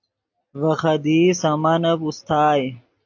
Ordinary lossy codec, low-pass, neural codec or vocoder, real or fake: AAC, 48 kbps; 7.2 kHz; none; real